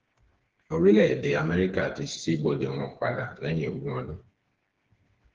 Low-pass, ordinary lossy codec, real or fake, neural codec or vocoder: 7.2 kHz; Opus, 16 kbps; fake; codec, 16 kHz, 4 kbps, FreqCodec, smaller model